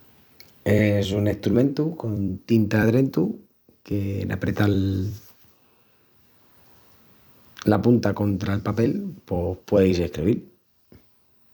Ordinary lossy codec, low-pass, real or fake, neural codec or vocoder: none; none; fake; vocoder, 44.1 kHz, 128 mel bands every 256 samples, BigVGAN v2